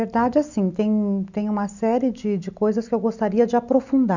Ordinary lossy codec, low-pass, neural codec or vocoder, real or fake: none; 7.2 kHz; none; real